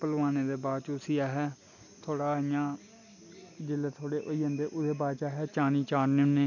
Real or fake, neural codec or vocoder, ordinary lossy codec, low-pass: real; none; none; 7.2 kHz